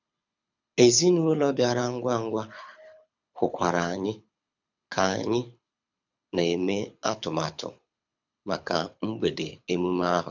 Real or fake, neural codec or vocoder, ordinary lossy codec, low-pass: fake; codec, 24 kHz, 6 kbps, HILCodec; none; 7.2 kHz